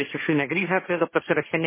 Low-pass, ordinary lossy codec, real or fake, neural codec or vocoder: 3.6 kHz; MP3, 16 kbps; fake; codec, 16 kHz, 1.1 kbps, Voila-Tokenizer